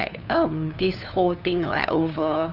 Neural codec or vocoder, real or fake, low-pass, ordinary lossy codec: codec, 16 kHz, 2 kbps, FunCodec, trained on LibriTTS, 25 frames a second; fake; 5.4 kHz; none